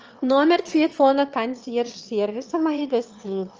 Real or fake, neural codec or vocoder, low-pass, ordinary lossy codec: fake; autoencoder, 22.05 kHz, a latent of 192 numbers a frame, VITS, trained on one speaker; 7.2 kHz; Opus, 24 kbps